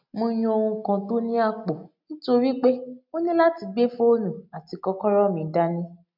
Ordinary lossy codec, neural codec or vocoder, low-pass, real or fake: MP3, 48 kbps; none; 5.4 kHz; real